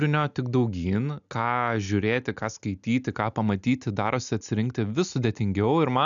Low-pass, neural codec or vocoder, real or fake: 7.2 kHz; none; real